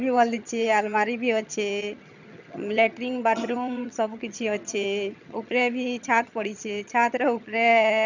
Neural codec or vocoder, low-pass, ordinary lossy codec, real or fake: vocoder, 22.05 kHz, 80 mel bands, HiFi-GAN; 7.2 kHz; none; fake